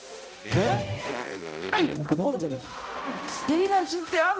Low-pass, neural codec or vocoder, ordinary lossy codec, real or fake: none; codec, 16 kHz, 0.5 kbps, X-Codec, HuBERT features, trained on balanced general audio; none; fake